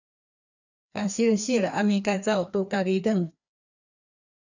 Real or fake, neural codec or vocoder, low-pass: fake; codec, 16 kHz, 2 kbps, FreqCodec, larger model; 7.2 kHz